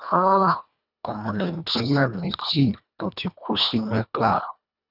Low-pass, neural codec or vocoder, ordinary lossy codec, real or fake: 5.4 kHz; codec, 24 kHz, 1.5 kbps, HILCodec; none; fake